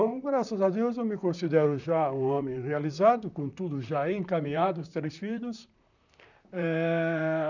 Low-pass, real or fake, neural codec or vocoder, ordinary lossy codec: 7.2 kHz; fake; vocoder, 44.1 kHz, 128 mel bands, Pupu-Vocoder; none